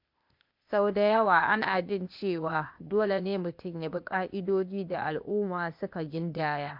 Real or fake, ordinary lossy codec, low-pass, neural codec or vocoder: fake; MP3, 48 kbps; 5.4 kHz; codec, 16 kHz, 0.8 kbps, ZipCodec